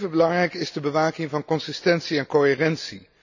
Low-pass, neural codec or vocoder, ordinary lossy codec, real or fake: 7.2 kHz; none; none; real